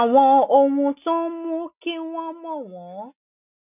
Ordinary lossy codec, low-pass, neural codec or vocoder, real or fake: none; 3.6 kHz; none; real